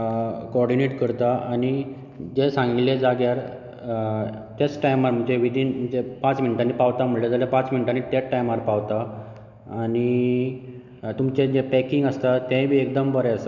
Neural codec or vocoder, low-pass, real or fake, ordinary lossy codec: none; 7.2 kHz; real; none